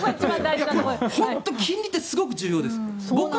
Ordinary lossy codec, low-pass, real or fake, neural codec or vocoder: none; none; real; none